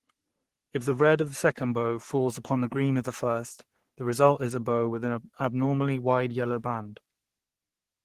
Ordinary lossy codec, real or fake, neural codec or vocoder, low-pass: Opus, 16 kbps; fake; codec, 44.1 kHz, 3.4 kbps, Pupu-Codec; 14.4 kHz